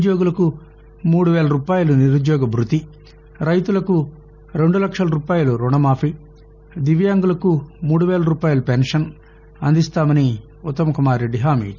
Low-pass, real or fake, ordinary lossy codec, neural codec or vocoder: 7.2 kHz; real; none; none